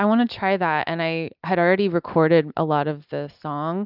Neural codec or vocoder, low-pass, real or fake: none; 5.4 kHz; real